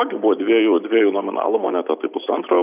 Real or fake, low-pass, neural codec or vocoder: real; 3.6 kHz; none